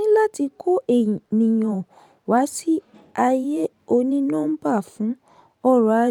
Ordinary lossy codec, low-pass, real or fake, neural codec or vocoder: none; 19.8 kHz; fake; vocoder, 44.1 kHz, 128 mel bands every 512 samples, BigVGAN v2